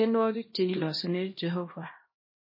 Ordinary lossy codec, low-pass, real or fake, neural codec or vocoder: MP3, 24 kbps; 5.4 kHz; fake; codec, 16 kHz, 1 kbps, X-Codec, HuBERT features, trained on LibriSpeech